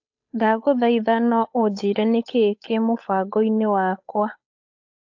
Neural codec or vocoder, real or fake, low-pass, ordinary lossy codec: codec, 16 kHz, 8 kbps, FunCodec, trained on Chinese and English, 25 frames a second; fake; 7.2 kHz; AAC, 48 kbps